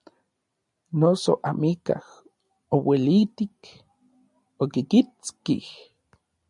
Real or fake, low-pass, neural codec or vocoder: real; 10.8 kHz; none